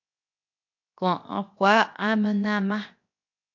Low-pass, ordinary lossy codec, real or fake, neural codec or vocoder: 7.2 kHz; MP3, 48 kbps; fake; codec, 16 kHz, 0.7 kbps, FocalCodec